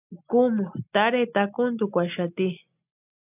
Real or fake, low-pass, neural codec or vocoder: real; 3.6 kHz; none